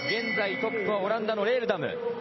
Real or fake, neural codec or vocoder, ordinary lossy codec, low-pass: real; none; MP3, 24 kbps; 7.2 kHz